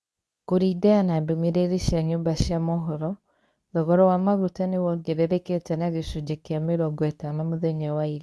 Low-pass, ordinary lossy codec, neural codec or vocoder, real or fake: none; none; codec, 24 kHz, 0.9 kbps, WavTokenizer, medium speech release version 2; fake